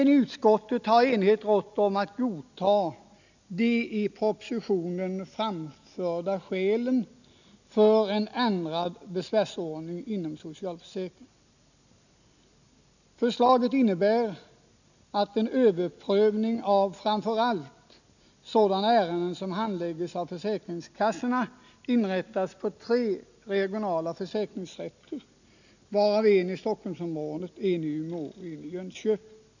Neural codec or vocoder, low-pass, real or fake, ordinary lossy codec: none; 7.2 kHz; real; none